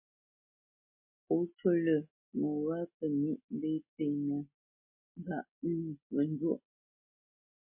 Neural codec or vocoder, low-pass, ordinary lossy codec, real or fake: none; 3.6 kHz; MP3, 24 kbps; real